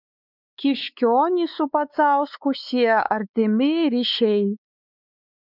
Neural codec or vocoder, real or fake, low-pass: codec, 16 kHz, 4 kbps, X-Codec, WavLM features, trained on Multilingual LibriSpeech; fake; 5.4 kHz